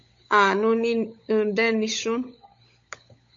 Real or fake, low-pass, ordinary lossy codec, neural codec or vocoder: fake; 7.2 kHz; MP3, 48 kbps; codec, 16 kHz, 16 kbps, FunCodec, trained on LibriTTS, 50 frames a second